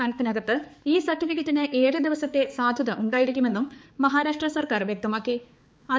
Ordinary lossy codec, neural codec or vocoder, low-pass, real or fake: none; codec, 16 kHz, 4 kbps, X-Codec, HuBERT features, trained on balanced general audio; none; fake